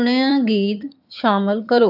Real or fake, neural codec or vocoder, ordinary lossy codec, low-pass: fake; vocoder, 22.05 kHz, 80 mel bands, HiFi-GAN; AAC, 48 kbps; 5.4 kHz